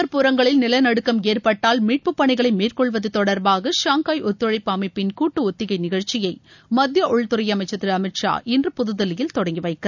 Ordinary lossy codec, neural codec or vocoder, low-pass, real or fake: none; none; 7.2 kHz; real